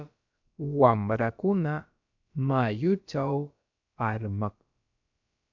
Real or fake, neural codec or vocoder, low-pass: fake; codec, 16 kHz, about 1 kbps, DyCAST, with the encoder's durations; 7.2 kHz